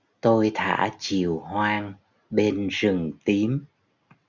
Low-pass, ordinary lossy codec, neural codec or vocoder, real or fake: 7.2 kHz; Opus, 64 kbps; none; real